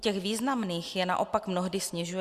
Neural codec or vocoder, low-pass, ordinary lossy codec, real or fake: none; 14.4 kHz; Opus, 64 kbps; real